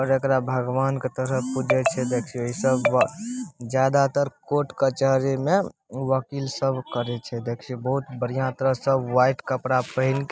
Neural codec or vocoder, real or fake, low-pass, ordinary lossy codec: none; real; none; none